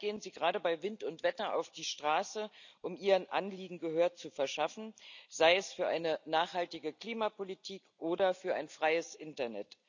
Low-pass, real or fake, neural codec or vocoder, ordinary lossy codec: 7.2 kHz; real; none; none